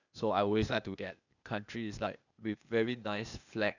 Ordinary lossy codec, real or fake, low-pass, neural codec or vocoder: none; fake; 7.2 kHz; codec, 16 kHz, 0.8 kbps, ZipCodec